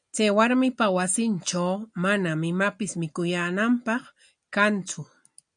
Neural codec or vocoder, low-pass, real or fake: none; 9.9 kHz; real